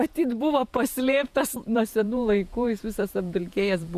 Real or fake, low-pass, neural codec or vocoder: fake; 14.4 kHz; vocoder, 48 kHz, 128 mel bands, Vocos